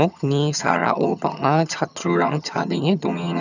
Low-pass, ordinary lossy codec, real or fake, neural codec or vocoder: 7.2 kHz; none; fake; vocoder, 22.05 kHz, 80 mel bands, HiFi-GAN